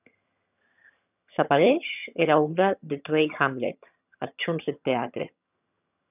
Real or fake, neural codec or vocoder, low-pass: fake; vocoder, 22.05 kHz, 80 mel bands, HiFi-GAN; 3.6 kHz